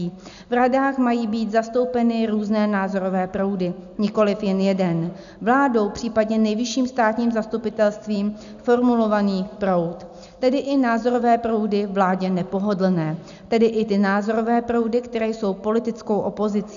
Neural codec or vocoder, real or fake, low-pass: none; real; 7.2 kHz